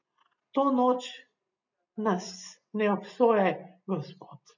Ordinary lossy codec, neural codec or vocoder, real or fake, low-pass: none; none; real; 7.2 kHz